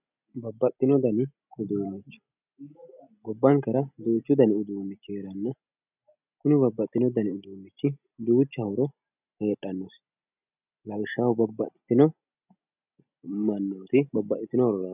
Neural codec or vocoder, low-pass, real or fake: none; 3.6 kHz; real